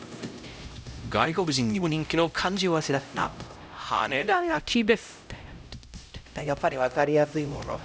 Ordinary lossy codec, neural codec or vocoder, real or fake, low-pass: none; codec, 16 kHz, 0.5 kbps, X-Codec, HuBERT features, trained on LibriSpeech; fake; none